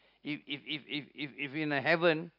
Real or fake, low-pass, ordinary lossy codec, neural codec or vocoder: real; 5.4 kHz; none; none